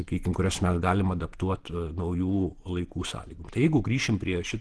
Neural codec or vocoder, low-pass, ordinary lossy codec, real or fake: vocoder, 48 kHz, 128 mel bands, Vocos; 10.8 kHz; Opus, 16 kbps; fake